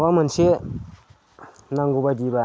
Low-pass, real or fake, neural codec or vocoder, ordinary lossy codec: none; real; none; none